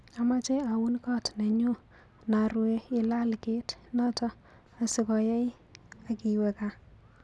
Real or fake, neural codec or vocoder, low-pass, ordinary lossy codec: real; none; none; none